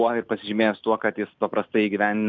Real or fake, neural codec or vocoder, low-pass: real; none; 7.2 kHz